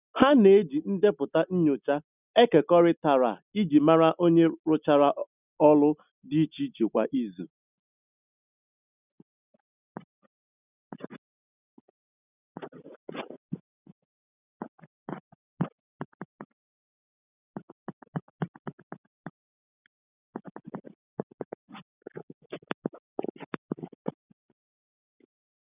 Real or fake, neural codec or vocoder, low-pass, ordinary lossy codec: real; none; 3.6 kHz; none